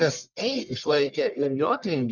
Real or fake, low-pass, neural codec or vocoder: fake; 7.2 kHz; codec, 44.1 kHz, 1.7 kbps, Pupu-Codec